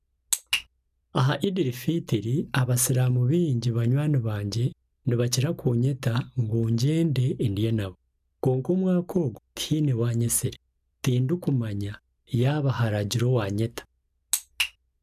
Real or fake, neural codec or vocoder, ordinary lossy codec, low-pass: real; none; none; 14.4 kHz